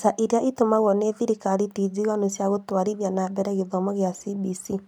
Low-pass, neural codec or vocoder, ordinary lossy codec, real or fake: 19.8 kHz; none; none; real